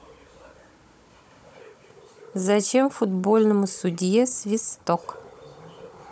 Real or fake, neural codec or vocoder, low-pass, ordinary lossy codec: fake; codec, 16 kHz, 16 kbps, FunCodec, trained on Chinese and English, 50 frames a second; none; none